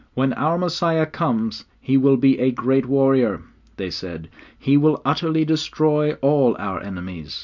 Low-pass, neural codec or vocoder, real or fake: 7.2 kHz; none; real